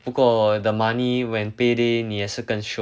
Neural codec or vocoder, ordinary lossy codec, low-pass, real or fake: none; none; none; real